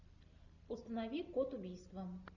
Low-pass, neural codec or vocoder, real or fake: 7.2 kHz; none; real